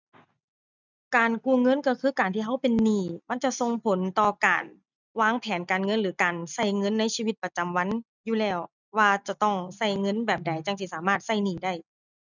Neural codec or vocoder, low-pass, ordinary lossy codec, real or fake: none; 7.2 kHz; none; real